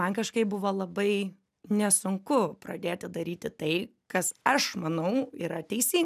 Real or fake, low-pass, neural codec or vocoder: real; 14.4 kHz; none